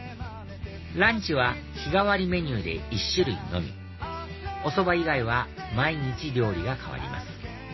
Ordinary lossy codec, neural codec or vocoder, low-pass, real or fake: MP3, 24 kbps; none; 7.2 kHz; real